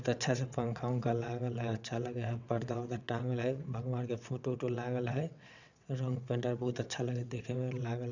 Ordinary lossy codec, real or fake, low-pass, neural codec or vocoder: none; fake; 7.2 kHz; vocoder, 22.05 kHz, 80 mel bands, WaveNeXt